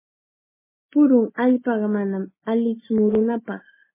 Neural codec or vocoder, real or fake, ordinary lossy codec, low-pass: none; real; MP3, 16 kbps; 3.6 kHz